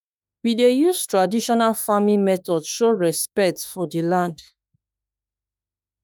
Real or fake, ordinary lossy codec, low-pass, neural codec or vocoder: fake; none; none; autoencoder, 48 kHz, 32 numbers a frame, DAC-VAE, trained on Japanese speech